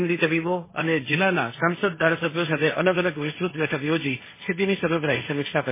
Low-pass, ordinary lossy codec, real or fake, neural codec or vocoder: 3.6 kHz; MP3, 16 kbps; fake; codec, 16 kHz, 1.1 kbps, Voila-Tokenizer